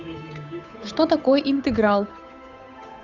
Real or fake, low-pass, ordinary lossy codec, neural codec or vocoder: fake; 7.2 kHz; none; codec, 16 kHz, 8 kbps, FunCodec, trained on Chinese and English, 25 frames a second